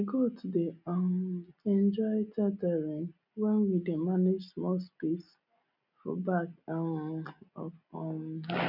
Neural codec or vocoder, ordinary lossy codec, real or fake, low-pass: none; none; real; 5.4 kHz